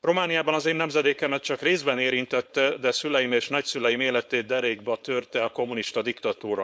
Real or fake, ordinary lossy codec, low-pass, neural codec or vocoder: fake; none; none; codec, 16 kHz, 4.8 kbps, FACodec